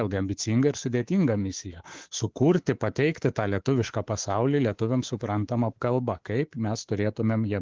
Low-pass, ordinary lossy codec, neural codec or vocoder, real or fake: 7.2 kHz; Opus, 16 kbps; codec, 24 kHz, 3.1 kbps, DualCodec; fake